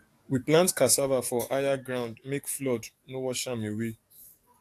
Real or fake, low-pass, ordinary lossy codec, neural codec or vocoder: fake; 14.4 kHz; AAC, 64 kbps; codec, 44.1 kHz, 7.8 kbps, DAC